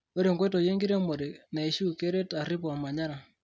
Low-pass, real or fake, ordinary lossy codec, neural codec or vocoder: none; real; none; none